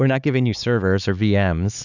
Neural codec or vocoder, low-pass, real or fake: codec, 16 kHz, 4 kbps, X-Codec, HuBERT features, trained on LibriSpeech; 7.2 kHz; fake